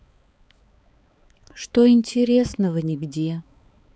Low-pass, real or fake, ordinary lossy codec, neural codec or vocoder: none; fake; none; codec, 16 kHz, 4 kbps, X-Codec, HuBERT features, trained on balanced general audio